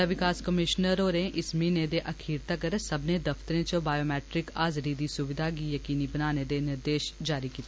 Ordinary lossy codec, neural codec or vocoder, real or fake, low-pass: none; none; real; none